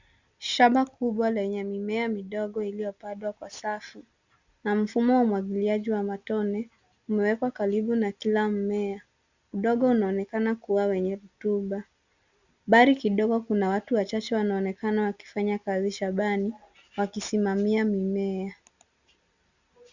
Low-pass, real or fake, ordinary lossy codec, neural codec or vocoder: 7.2 kHz; real; Opus, 64 kbps; none